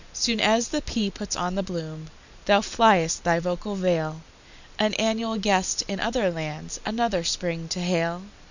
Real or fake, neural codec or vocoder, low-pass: real; none; 7.2 kHz